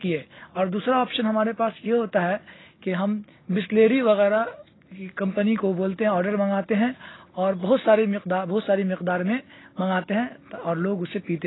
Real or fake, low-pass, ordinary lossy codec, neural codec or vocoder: real; 7.2 kHz; AAC, 16 kbps; none